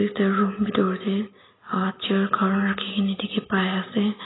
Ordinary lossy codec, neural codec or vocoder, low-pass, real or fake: AAC, 16 kbps; none; 7.2 kHz; real